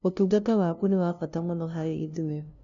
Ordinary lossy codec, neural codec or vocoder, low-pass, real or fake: none; codec, 16 kHz, 0.5 kbps, FunCodec, trained on LibriTTS, 25 frames a second; 7.2 kHz; fake